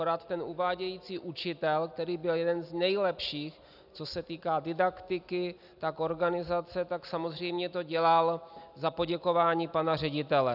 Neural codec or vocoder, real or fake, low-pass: none; real; 5.4 kHz